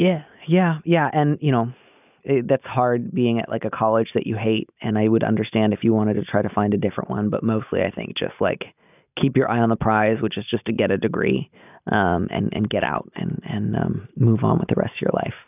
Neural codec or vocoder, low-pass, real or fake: none; 3.6 kHz; real